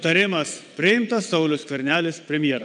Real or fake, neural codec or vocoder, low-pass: fake; vocoder, 22.05 kHz, 80 mel bands, WaveNeXt; 9.9 kHz